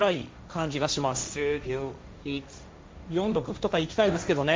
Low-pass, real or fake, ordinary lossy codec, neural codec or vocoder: none; fake; none; codec, 16 kHz, 1.1 kbps, Voila-Tokenizer